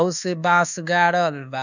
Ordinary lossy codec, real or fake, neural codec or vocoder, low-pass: none; fake; codec, 24 kHz, 1.2 kbps, DualCodec; 7.2 kHz